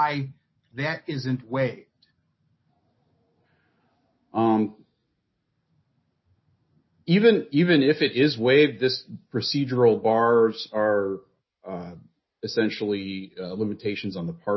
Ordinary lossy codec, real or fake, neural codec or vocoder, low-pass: MP3, 24 kbps; real; none; 7.2 kHz